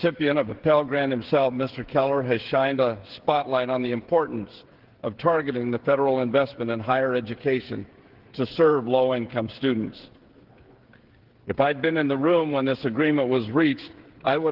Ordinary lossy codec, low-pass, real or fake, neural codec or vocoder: Opus, 16 kbps; 5.4 kHz; fake; codec, 16 kHz, 8 kbps, FreqCodec, smaller model